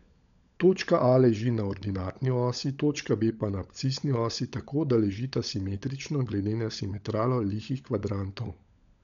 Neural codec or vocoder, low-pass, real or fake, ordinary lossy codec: codec, 16 kHz, 16 kbps, FunCodec, trained on LibriTTS, 50 frames a second; 7.2 kHz; fake; none